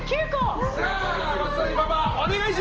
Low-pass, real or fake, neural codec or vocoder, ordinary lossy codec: 7.2 kHz; real; none; Opus, 16 kbps